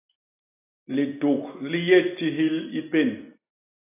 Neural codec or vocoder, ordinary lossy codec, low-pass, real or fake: none; AAC, 32 kbps; 3.6 kHz; real